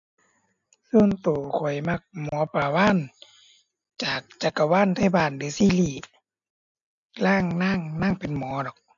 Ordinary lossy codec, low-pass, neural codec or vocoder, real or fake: AAC, 64 kbps; 7.2 kHz; none; real